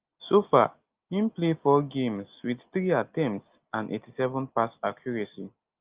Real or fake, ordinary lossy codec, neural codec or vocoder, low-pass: real; Opus, 32 kbps; none; 3.6 kHz